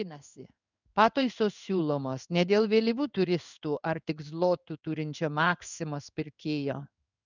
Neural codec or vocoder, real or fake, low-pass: codec, 16 kHz in and 24 kHz out, 1 kbps, XY-Tokenizer; fake; 7.2 kHz